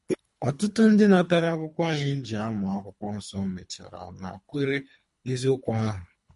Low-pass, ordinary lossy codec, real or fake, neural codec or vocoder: 10.8 kHz; MP3, 48 kbps; fake; codec, 24 kHz, 3 kbps, HILCodec